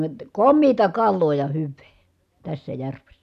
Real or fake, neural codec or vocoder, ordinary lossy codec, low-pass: real; none; none; 14.4 kHz